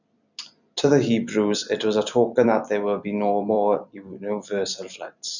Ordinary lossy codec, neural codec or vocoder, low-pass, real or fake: none; vocoder, 44.1 kHz, 128 mel bands every 256 samples, BigVGAN v2; 7.2 kHz; fake